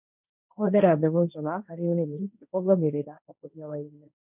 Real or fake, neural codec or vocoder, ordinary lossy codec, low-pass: fake; codec, 16 kHz, 1.1 kbps, Voila-Tokenizer; none; 3.6 kHz